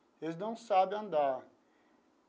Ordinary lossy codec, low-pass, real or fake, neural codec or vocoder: none; none; real; none